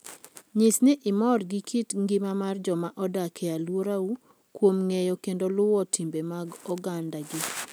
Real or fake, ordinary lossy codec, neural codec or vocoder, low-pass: real; none; none; none